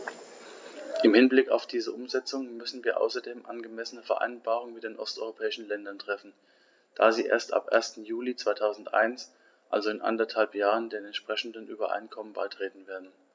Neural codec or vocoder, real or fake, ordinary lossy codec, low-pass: none; real; none; none